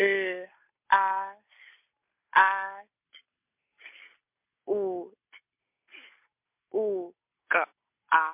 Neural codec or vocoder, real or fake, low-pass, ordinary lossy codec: none; real; 3.6 kHz; MP3, 24 kbps